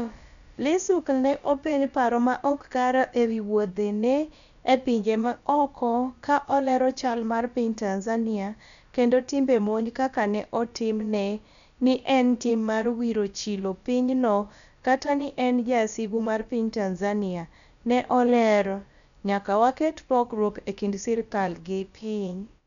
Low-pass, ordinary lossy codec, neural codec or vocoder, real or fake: 7.2 kHz; MP3, 96 kbps; codec, 16 kHz, about 1 kbps, DyCAST, with the encoder's durations; fake